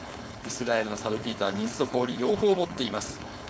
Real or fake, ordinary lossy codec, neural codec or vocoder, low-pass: fake; none; codec, 16 kHz, 4.8 kbps, FACodec; none